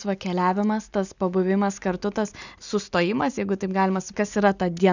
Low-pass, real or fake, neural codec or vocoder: 7.2 kHz; real; none